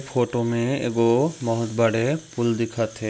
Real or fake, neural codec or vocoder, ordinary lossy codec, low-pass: real; none; none; none